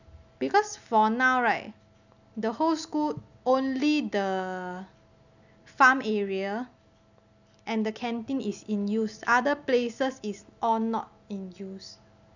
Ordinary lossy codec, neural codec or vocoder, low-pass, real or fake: none; none; 7.2 kHz; real